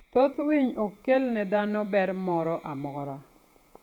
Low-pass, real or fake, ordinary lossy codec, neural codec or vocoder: 19.8 kHz; fake; none; vocoder, 48 kHz, 128 mel bands, Vocos